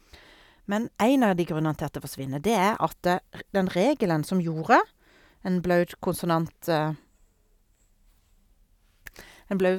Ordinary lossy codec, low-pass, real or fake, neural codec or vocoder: none; 19.8 kHz; real; none